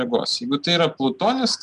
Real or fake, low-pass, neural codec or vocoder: real; 10.8 kHz; none